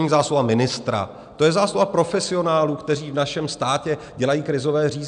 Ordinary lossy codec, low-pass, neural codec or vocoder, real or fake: MP3, 96 kbps; 9.9 kHz; none; real